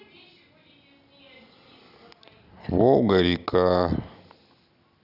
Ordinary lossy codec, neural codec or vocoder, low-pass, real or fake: none; none; 5.4 kHz; real